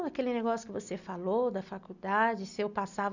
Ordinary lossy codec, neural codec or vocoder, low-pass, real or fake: none; none; 7.2 kHz; real